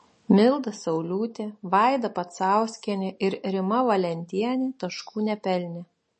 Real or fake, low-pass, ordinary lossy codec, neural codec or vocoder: real; 10.8 kHz; MP3, 32 kbps; none